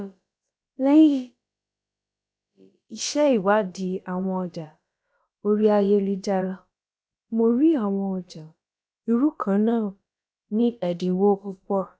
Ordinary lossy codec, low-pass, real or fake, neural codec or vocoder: none; none; fake; codec, 16 kHz, about 1 kbps, DyCAST, with the encoder's durations